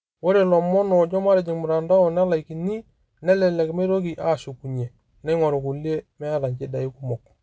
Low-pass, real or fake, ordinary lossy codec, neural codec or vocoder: none; real; none; none